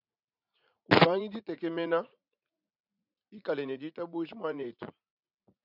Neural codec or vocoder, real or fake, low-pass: vocoder, 44.1 kHz, 80 mel bands, Vocos; fake; 5.4 kHz